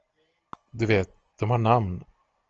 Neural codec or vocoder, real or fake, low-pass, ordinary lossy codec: none; real; 7.2 kHz; Opus, 24 kbps